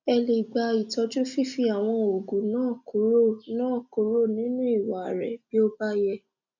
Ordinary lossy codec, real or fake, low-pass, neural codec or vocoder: none; real; 7.2 kHz; none